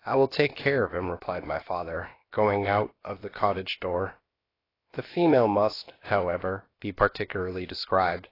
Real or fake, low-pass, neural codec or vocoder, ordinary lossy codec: fake; 5.4 kHz; codec, 16 kHz, about 1 kbps, DyCAST, with the encoder's durations; AAC, 24 kbps